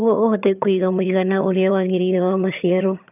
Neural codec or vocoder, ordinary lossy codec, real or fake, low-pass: vocoder, 22.05 kHz, 80 mel bands, HiFi-GAN; none; fake; 3.6 kHz